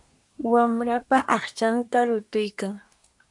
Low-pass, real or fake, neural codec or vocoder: 10.8 kHz; fake; codec, 24 kHz, 1 kbps, SNAC